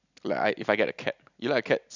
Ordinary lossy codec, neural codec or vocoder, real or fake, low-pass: none; none; real; 7.2 kHz